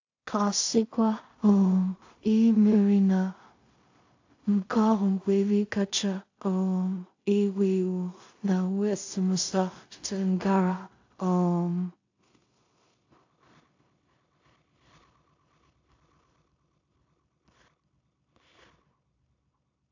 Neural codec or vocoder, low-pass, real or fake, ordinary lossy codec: codec, 16 kHz in and 24 kHz out, 0.4 kbps, LongCat-Audio-Codec, two codebook decoder; 7.2 kHz; fake; AAC, 48 kbps